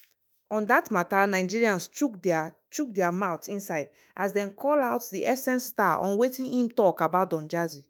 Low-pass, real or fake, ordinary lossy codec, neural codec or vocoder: none; fake; none; autoencoder, 48 kHz, 32 numbers a frame, DAC-VAE, trained on Japanese speech